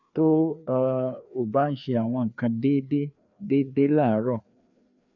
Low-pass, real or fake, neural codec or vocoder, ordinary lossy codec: 7.2 kHz; fake; codec, 16 kHz, 2 kbps, FreqCodec, larger model; none